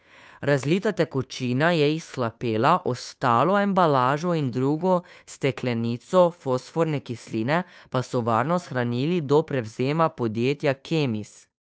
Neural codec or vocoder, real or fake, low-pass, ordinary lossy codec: codec, 16 kHz, 2 kbps, FunCodec, trained on Chinese and English, 25 frames a second; fake; none; none